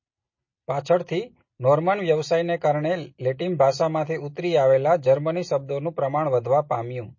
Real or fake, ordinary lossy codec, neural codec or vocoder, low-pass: real; MP3, 32 kbps; none; 7.2 kHz